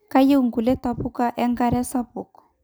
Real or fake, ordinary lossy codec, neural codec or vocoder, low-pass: real; none; none; none